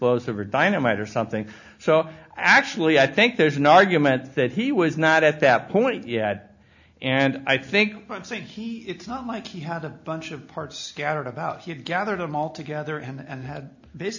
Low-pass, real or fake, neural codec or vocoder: 7.2 kHz; real; none